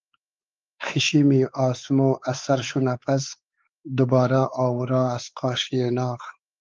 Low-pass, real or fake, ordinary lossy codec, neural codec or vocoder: 7.2 kHz; fake; Opus, 24 kbps; codec, 16 kHz, 4 kbps, X-Codec, WavLM features, trained on Multilingual LibriSpeech